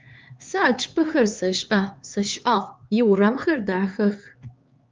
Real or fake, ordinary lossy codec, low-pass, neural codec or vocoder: fake; Opus, 24 kbps; 7.2 kHz; codec, 16 kHz, 4 kbps, X-Codec, HuBERT features, trained on LibriSpeech